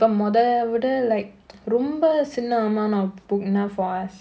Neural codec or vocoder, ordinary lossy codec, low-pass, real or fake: none; none; none; real